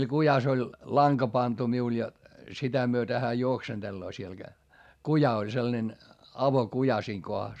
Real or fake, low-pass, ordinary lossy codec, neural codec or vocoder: real; 14.4 kHz; none; none